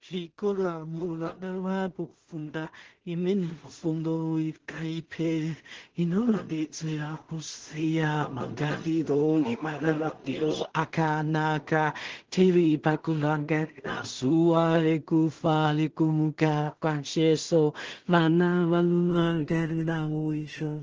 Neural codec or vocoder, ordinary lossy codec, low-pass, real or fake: codec, 16 kHz in and 24 kHz out, 0.4 kbps, LongCat-Audio-Codec, two codebook decoder; Opus, 16 kbps; 7.2 kHz; fake